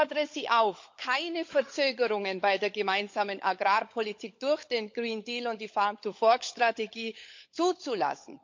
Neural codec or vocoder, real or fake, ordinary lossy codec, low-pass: codec, 16 kHz, 16 kbps, FunCodec, trained on LibriTTS, 50 frames a second; fake; MP3, 48 kbps; 7.2 kHz